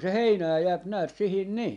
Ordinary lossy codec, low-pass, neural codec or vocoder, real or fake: none; 10.8 kHz; none; real